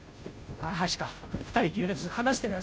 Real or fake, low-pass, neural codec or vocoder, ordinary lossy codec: fake; none; codec, 16 kHz, 0.5 kbps, FunCodec, trained on Chinese and English, 25 frames a second; none